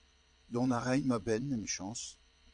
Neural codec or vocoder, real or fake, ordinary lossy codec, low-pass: vocoder, 22.05 kHz, 80 mel bands, Vocos; fake; AAC, 64 kbps; 9.9 kHz